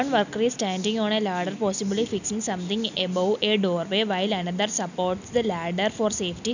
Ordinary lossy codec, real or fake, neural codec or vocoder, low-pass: none; real; none; 7.2 kHz